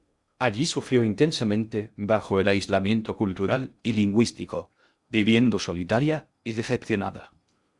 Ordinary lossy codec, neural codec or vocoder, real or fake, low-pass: Opus, 64 kbps; codec, 16 kHz in and 24 kHz out, 0.6 kbps, FocalCodec, streaming, 2048 codes; fake; 10.8 kHz